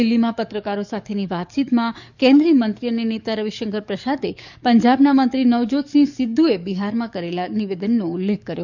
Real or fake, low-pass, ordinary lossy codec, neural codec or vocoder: fake; 7.2 kHz; none; codec, 44.1 kHz, 7.8 kbps, DAC